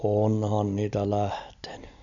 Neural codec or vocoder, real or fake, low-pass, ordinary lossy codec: none; real; 7.2 kHz; MP3, 96 kbps